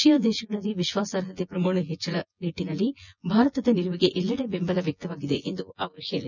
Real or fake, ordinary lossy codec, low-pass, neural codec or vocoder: fake; none; 7.2 kHz; vocoder, 24 kHz, 100 mel bands, Vocos